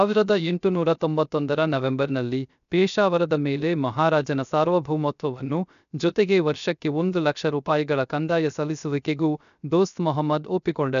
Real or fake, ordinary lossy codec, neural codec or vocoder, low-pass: fake; none; codec, 16 kHz, 0.3 kbps, FocalCodec; 7.2 kHz